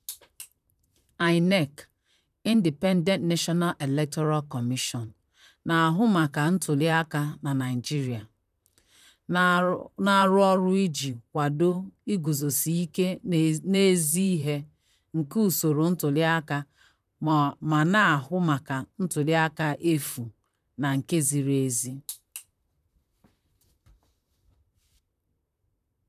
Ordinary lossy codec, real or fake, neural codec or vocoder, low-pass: none; fake; vocoder, 44.1 kHz, 128 mel bands, Pupu-Vocoder; 14.4 kHz